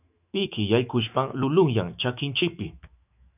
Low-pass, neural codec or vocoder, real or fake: 3.6 kHz; autoencoder, 48 kHz, 128 numbers a frame, DAC-VAE, trained on Japanese speech; fake